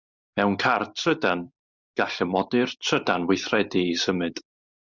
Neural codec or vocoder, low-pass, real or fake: none; 7.2 kHz; real